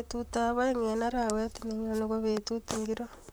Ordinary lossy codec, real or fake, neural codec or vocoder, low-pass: none; fake; vocoder, 44.1 kHz, 128 mel bands, Pupu-Vocoder; none